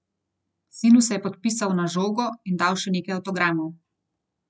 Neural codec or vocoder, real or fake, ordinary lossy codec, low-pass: none; real; none; none